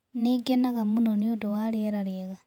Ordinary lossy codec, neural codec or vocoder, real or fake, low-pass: none; vocoder, 48 kHz, 128 mel bands, Vocos; fake; 19.8 kHz